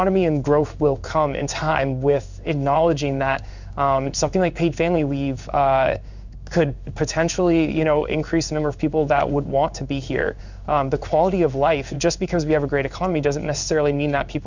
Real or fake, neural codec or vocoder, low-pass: fake; codec, 16 kHz in and 24 kHz out, 1 kbps, XY-Tokenizer; 7.2 kHz